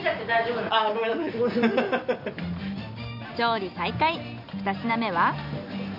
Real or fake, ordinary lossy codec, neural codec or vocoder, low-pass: real; none; none; 5.4 kHz